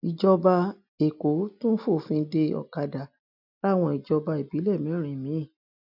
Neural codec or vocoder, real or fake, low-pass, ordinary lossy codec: none; real; 5.4 kHz; none